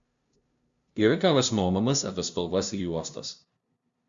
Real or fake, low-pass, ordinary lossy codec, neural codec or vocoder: fake; 7.2 kHz; Opus, 64 kbps; codec, 16 kHz, 0.5 kbps, FunCodec, trained on LibriTTS, 25 frames a second